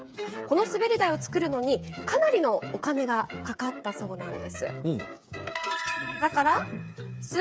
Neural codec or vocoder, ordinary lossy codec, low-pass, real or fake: codec, 16 kHz, 8 kbps, FreqCodec, smaller model; none; none; fake